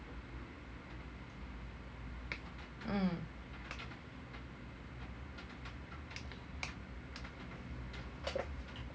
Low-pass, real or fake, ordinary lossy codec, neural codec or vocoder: none; real; none; none